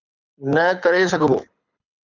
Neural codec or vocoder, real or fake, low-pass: codec, 24 kHz, 6 kbps, HILCodec; fake; 7.2 kHz